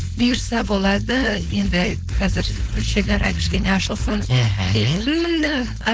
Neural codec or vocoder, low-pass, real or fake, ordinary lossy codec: codec, 16 kHz, 4.8 kbps, FACodec; none; fake; none